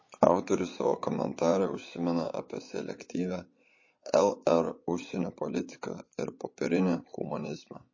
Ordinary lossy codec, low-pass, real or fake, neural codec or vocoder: MP3, 32 kbps; 7.2 kHz; fake; vocoder, 22.05 kHz, 80 mel bands, Vocos